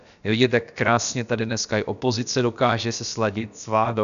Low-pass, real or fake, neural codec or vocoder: 7.2 kHz; fake; codec, 16 kHz, about 1 kbps, DyCAST, with the encoder's durations